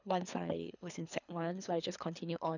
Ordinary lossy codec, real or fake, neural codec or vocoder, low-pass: none; fake; codec, 24 kHz, 3 kbps, HILCodec; 7.2 kHz